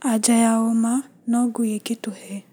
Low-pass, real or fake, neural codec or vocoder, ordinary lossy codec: none; real; none; none